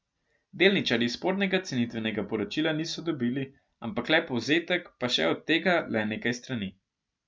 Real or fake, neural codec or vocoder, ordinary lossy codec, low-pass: real; none; none; none